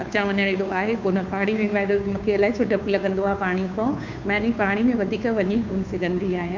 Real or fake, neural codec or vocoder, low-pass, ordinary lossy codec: fake; codec, 16 kHz, 2 kbps, FunCodec, trained on Chinese and English, 25 frames a second; 7.2 kHz; MP3, 64 kbps